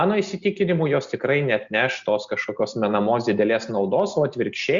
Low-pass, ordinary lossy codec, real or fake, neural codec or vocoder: 7.2 kHz; Opus, 64 kbps; real; none